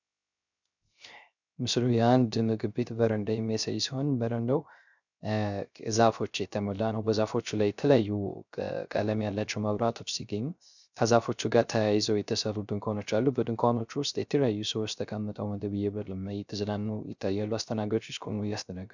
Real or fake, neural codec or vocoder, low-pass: fake; codec, 16 kHz, 0.3 kbps, FocalCodec; 7.2 kHz